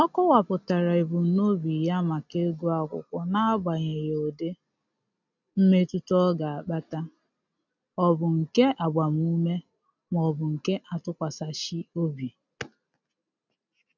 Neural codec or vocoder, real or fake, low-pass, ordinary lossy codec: none; real; 7.2 kHz; none